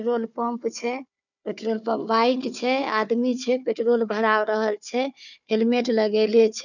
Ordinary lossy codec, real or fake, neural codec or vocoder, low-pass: none; fake; codec, 16 kHz, 4 kbps, FunCodec, trained on Chinese and English, 50 frames a second; 7.2 kHz